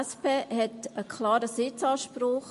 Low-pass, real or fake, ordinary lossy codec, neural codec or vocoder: 14.4 kHz; real; MP3, 48 kbps; none